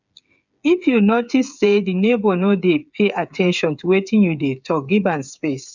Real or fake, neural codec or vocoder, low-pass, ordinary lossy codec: fake; codec, 16 kHz, 8 kbps, FreqCodec, smaller model; 7.2 kHz; none